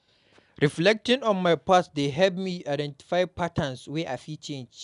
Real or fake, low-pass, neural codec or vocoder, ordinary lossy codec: real; 10.8 kHz; none; none